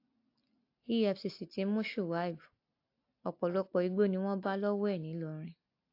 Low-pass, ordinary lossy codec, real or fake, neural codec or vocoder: 5.4 kHz; AAC, 32 kbps; real; none